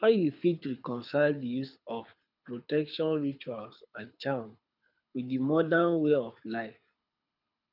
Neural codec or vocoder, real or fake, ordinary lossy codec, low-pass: codec, 24 kHz, 6 kbps, HILCodec; fake; none; 5.4 kHz